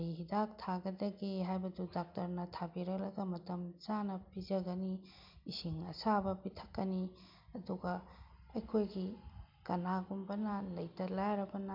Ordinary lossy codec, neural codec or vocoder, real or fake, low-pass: MP3, 48 kbps; none; real; 5.4 kHz